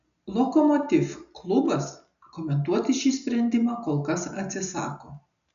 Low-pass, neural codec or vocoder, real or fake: 7.2 kHz; none; real